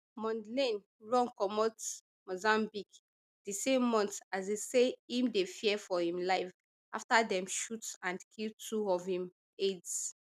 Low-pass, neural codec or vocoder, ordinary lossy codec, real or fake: 14.4 kHz; none; none; real